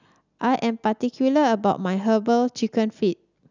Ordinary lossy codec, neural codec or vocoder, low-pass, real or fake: none; none; 7.2 kHz; real